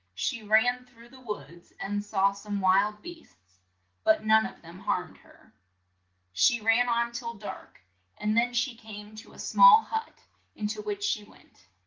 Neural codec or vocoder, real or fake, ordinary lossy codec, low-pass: none; real; Opus, 16 kbps; 7.2 kHz